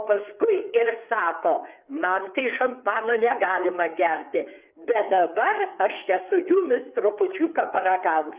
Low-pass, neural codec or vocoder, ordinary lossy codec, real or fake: 3.6 kHz; codec, 16 kHz, 4 kbps, FreqCodec, larger model; Opus, 64 kbps; fake